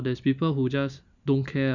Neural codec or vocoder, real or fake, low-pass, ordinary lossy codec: none; real; 7.2 kHz; none